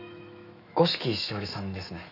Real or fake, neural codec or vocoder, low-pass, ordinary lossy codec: fake; autoencoder, 48 kHz, 128 numbers a frame, DAC-VAE, trained on Japanese speech; 5.4 kHz; none